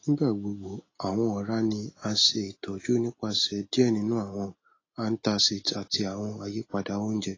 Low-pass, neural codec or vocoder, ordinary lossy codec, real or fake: 7.2 kHz; none; AAC, 32 kbps; real